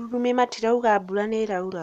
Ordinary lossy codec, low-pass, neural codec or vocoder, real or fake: none; 14.4 kHz; none; real